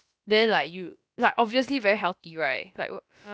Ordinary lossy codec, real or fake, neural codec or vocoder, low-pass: none; fake; codec, 16 kHz, about 1 kbps, DyCAST, with the encoder's durations; none